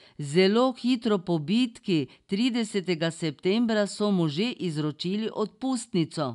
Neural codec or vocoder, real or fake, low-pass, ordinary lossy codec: none; real; 10.8 kHz; none